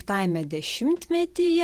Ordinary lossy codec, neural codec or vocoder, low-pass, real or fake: Opus, 24 kbps; vocoder, 48 kHz, 128 mel bands, Vocos; 14.4 kHz; fake